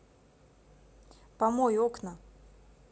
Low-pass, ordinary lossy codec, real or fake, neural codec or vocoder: none; none; real; none